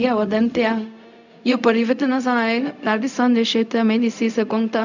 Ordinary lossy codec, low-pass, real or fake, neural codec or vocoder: none; 7.2 kHz; fake; codec, 16 kHz, 0.4 kbps, LongCat-Audio-Codec